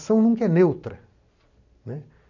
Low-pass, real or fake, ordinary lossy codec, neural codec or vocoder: 7.2 kHz; real; none; none